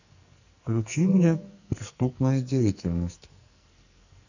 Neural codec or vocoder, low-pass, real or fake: codec, 44.1 kHz, 2.6 kbps, SNAC; 7.2 kHz; fake